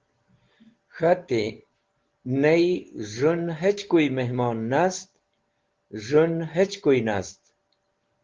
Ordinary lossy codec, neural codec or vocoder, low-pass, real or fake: Opus, 16 kbps; none; 7.2 kHz; real